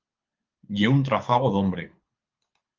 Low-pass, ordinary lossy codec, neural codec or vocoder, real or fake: 7.2 kHz; Opus, 32 kbps; codec, 24 kHz, 6 kbps, HILCodec; fake